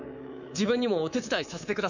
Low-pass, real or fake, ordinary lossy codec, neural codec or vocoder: 7.2 kHz; fake; none; codec, 24 kHz, 3.1 kbps, DualCodec